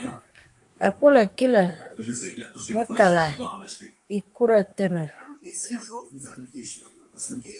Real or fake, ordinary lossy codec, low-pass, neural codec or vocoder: fake; AAC, 64 kbps; 10.8 kHz; codec, 24 kHz, 1 kbps, SNAC